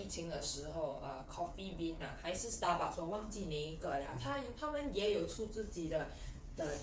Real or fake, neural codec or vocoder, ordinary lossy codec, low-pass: fake; codec, 16 kHz, 8 kbps, FreqCodec, smaller model; none; none